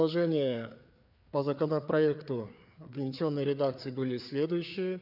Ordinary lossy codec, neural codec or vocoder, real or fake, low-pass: none; codec, 16 kHz, 4 kbps, FreqCodec, larger model; fake; 5.4 kHz